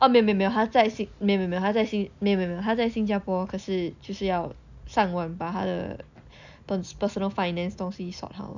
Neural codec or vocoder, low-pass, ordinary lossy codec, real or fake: none; 7.2 kHz; none; real